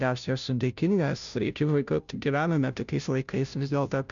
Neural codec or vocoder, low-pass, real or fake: codec, 16 kHz, 0.5 kbps, FunCodec, trained on Chinese and English, 25 frames a second; 7.2 kHz; fake